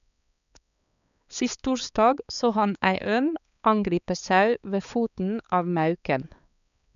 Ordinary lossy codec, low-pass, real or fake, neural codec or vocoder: none; 7.2 kHz; fake; codec, 16 kHz, 4 kbps, X-Codec, HuBERT features, trained on balanced general audio